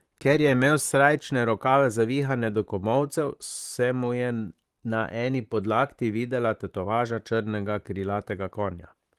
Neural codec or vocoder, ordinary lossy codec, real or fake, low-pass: vocoder, 44.1 kHz, 128 mel bands, Pupu-Vocoder; Opus, 24 kbps; fake; 14.4 kHz